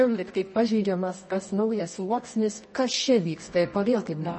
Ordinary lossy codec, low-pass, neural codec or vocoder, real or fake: MP3, 32 kbps; 10.8 kHz; codec, 24 kHz, 0.9 kbps, WavTokenizer, medium music audio release; fake